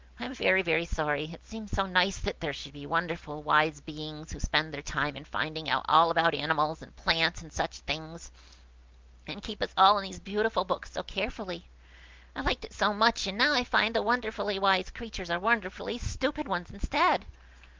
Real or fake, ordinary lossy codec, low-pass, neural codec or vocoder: real; Opus, 32 kbps; 7.2 kHz; none